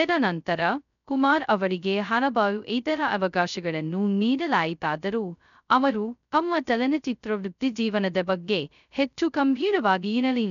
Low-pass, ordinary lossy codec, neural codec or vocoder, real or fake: 7.2 kHz; none; codec, 16 kHz, 0.2 kbps, FocalCodec; fake